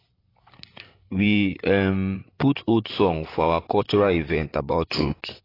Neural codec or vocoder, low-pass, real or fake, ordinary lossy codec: vocoder, 44.1 kHz, 128 mel bands, Pupu-Vocoder; 5.4 kHz; fake; AAC, 24 kbps